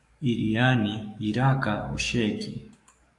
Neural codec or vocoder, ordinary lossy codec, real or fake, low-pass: codec, 44.1 kHz, 7.8 kbps, DAC; AAC, 64 kbps; fake; 10.8 kHz